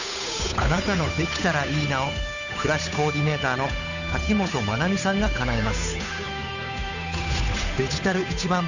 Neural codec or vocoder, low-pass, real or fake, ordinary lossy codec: codec, 16 kHz, 8 kbps, FunCodec, trained on Chinese and English, 25 frames a second; 7.2 kHz; fake; none